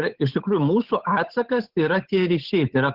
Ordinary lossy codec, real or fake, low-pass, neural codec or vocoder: Opus, 16 kbps; fake; 5.4 kHz; codec, 16 kHz, 8 kbps, FunCodec, trained on Chinese and English, 25 frames a second